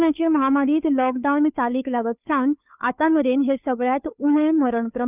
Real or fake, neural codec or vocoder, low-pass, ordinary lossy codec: fake; codec, 16 kHz, 2 kbps, FunCodec, trained on Chinese and English, 25 frames a second; 3.6 kHz; none